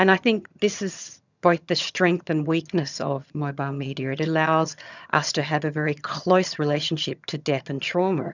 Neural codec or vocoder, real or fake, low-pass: vocoder, 22.05 kHz, 80 mel bands, HiFi-GAN; fake; 7.2 kHz